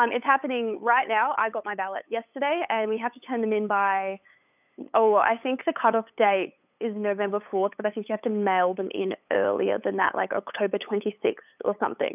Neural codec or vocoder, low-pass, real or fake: codec, 16 kHz, 8 kbps, FunCodec, trained on LibriTTS, 25 frames a second; 3.6 kHz; fake